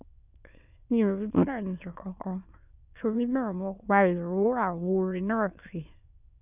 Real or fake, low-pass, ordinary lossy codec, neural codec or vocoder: fake; 3.6 kHz; none; autoencoder, 22.05 kHz, a latent of 192 numbers a frame, VITS, trained on many speakers